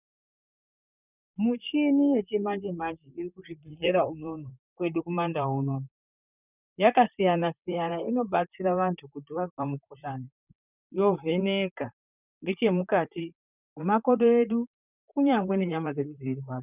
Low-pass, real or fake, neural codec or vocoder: 3.6 kHz; fake; vocoder, 44.1 kHz, 128 mel bands, Pupu-Vocoder